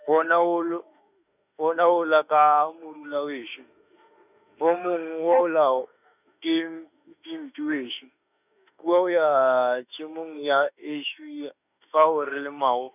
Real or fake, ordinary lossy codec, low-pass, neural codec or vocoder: fake; none; 3.6 kHz; autoencoder, 48 kHz, 32 numbers a frame, DAC-VAE, trained on Japanese speech